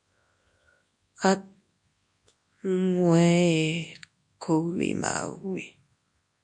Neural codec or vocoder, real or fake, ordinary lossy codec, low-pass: codec, 24 kHz, 0.9 kbps, WavTokenizer, large speech release; fake; MP3, 48 kbps; 10.8 kHz